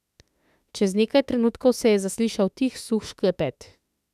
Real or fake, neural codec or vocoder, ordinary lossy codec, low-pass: fake; autoencoder, 48 kHz, 32 numbers a frame, DAC-VAE, trained on Japanese speech; none; 14.4 kHz